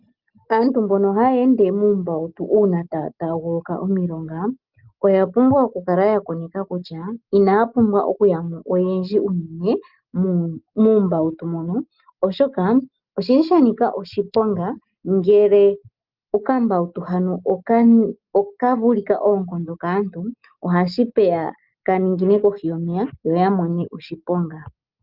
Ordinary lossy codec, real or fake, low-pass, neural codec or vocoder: Opus, 32 kbps; real; 5.4 kHz; none